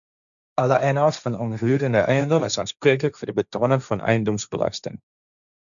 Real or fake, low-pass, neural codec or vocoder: fake; 7.2 kHz; codec, 16 kHz, 1.1 kbps, Voila-Tokenizer